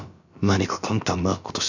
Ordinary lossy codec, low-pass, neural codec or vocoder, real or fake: none; 7.2 kHz; codec, 16 kHz, about 1 kbps, DyCAST, with the encoder's durations; fake